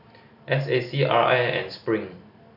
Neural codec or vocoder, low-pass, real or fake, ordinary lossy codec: none; 5.4 kHz; real; none